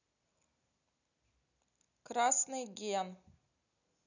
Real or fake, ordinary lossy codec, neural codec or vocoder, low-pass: real; none; none; 7.2 kHz